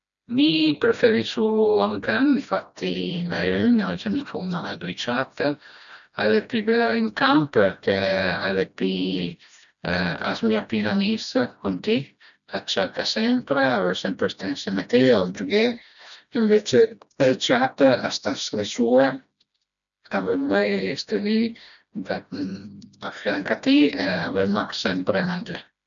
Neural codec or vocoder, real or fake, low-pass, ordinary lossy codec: codec, 16 kHz, 1 kbps, FreqCodec, smaller model; fake; 7.2 kHz; none